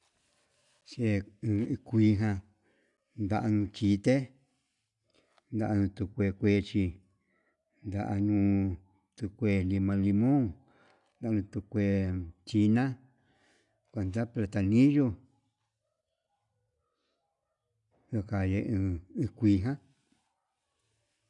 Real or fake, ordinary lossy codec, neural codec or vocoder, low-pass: real; none; none; 10.8 kHz